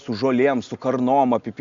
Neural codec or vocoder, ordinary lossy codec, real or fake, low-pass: none; Opus, 64 kbps; real; 7.2 kHz